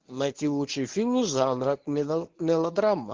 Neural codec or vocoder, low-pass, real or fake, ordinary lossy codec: codec, 24 kHz, 0.9 kbps, WavTokenizer, medium speech release version 1; 7.2 kHz; fake; Opus, 16 kbps